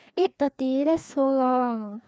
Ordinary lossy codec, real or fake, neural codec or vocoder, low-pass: none; fake; codec, 16 kHz, 2 kbps, FreqCodec, larger model; none